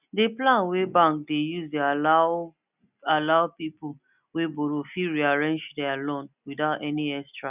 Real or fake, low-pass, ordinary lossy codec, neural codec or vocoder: real; 3.6 kHz; none; none